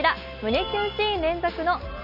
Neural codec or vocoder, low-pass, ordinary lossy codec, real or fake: none; 5.4 kHz; none; real